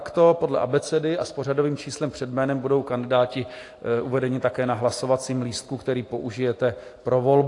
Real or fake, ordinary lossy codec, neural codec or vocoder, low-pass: real; AAC, 48 kbps; none; 10.8 kHz